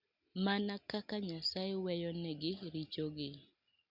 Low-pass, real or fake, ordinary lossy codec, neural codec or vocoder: 5.4 kHz; real; Opus, 64 kbps; none